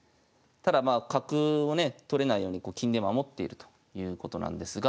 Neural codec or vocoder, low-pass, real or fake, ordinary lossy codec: none; none; real; none